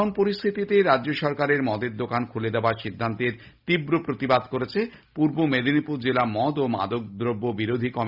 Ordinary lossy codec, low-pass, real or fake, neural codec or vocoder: Opus, 64 kbps; 5.4 kHz; real; none